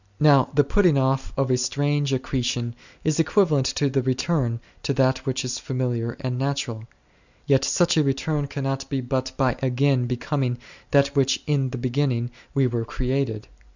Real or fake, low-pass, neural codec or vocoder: real; 7.2 kHz; none